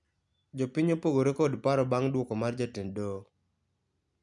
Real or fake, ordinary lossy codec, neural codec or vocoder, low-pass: real; none; none; 10.8 kHz